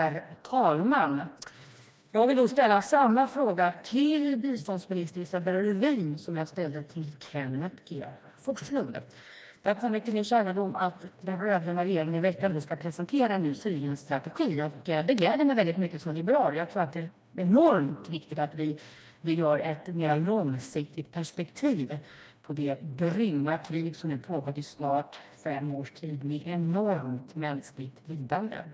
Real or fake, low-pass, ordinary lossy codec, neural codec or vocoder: fake; none; none; codec, 16 kHz, 1 kbps, FreqCodec, smaller model